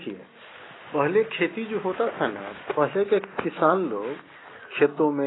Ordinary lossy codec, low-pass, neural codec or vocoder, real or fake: AAC, 16 kbps; 7.2 kHz; none; real